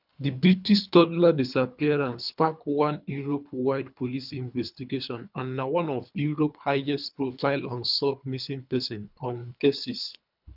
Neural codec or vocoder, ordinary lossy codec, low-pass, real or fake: codec, 24 kHz, 3 kbps, HILCodec; none; 5.4 kHz; fake